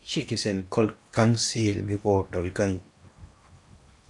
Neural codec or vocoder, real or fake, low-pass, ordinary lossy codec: codec, 16 kHz in and 24 kHz out, 0.8 kbps, FocalCodec, streaming, 65536 codes; fake; 10.8 kHz; MP3, 96 kbps